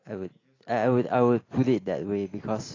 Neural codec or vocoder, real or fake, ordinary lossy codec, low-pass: none; real; AAC, 32 kbps; 7.2 kHz